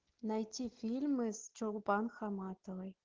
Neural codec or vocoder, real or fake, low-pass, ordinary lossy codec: none; real; 7.2 kHz; Opus, 16 kbps